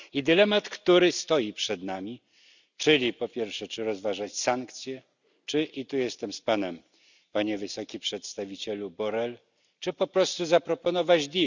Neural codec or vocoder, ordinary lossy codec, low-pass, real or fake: none; none; 7.2 kHz; real